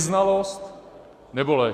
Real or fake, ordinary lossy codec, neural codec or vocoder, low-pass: real; Opus, 64 kbps; none; 14.4 kHz